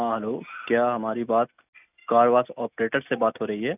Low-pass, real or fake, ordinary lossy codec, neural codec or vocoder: 3.6 kHz; real; none; none